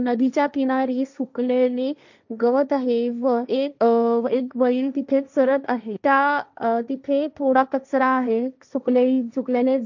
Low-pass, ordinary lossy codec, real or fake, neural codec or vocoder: 7.2 kHz; none; fake; codec, 16 kHz, 1.1 kbps, Voila-Tokenizer